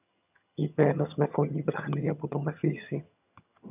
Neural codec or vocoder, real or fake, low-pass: vocoder, 22.05 kHz, 80 mel bands, HiFi-GAN; fake; 3.6 kHz